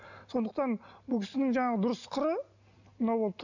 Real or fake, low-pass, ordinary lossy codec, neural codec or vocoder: real; 7.2 kHz; none; none